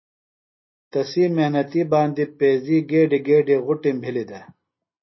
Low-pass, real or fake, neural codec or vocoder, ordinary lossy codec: 7.2 kHz; real; none; MP3, 24 kbps